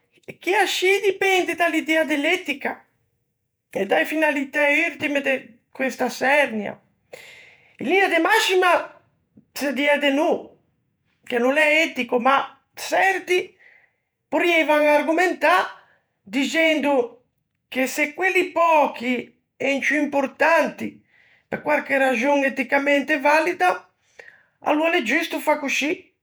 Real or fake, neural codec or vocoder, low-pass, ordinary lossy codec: fake; vocoder, 48 kHz, 128 mel bands, Vocos; none; none